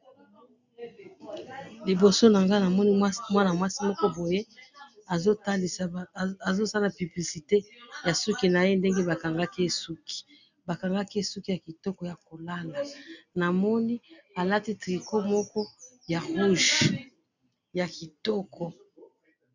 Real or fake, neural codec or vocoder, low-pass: real; none; 7.2 kHz